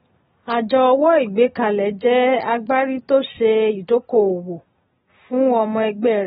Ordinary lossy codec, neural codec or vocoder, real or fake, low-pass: AAC, 16 kbps; none; real; 10.8 kHz